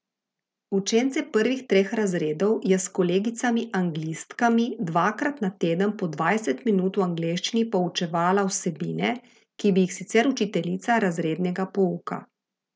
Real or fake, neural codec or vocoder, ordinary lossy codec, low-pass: real; none; none; none